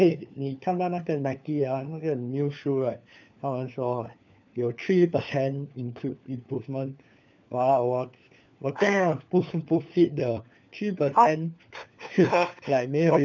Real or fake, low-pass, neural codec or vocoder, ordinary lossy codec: fake; 7.2 kHz; codec, 16 kHz, 4 kbps, FunCodec, trained on LibriTTS, 50 frames a second; none